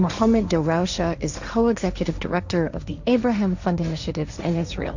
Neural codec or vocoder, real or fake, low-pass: codec, 16 kHz, 1.1 kbps, Voila-Tokenizer; fake; 7.2 kHz